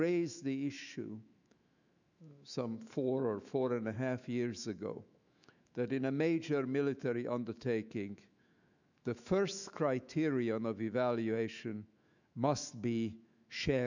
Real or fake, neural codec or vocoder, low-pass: fake; autoencoder, 48 kHz, 128 numbers a frame, DAC-VAE, trained on Japanese speech; 7.2 kHz